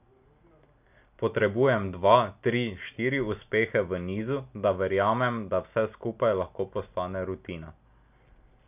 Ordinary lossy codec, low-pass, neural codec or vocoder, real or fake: none; 3.6 kHz; none; real